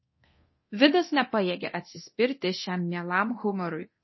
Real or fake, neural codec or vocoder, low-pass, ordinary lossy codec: fake; codec, 24 kHz, 0.9 kbps, DualCodec; 7.2 kHz; MP3, 24 kbps